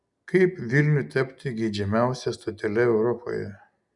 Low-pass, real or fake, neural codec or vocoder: 10.8 kHz; real; none